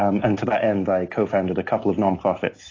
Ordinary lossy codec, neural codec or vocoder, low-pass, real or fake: AAC, 32 kbps; none; 7.2 kHz; real